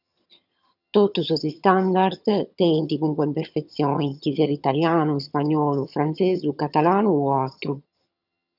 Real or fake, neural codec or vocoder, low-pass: fake; vocoder, 22.05 kHz, 80 mel bands, HiFi-GAN; 5.4 kHz